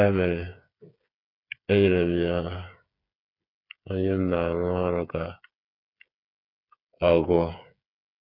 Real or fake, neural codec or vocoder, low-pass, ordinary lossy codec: fake; codec, 16 kHz, 8 kbps, FreqCodec, smaller model; 5.4 kHz; none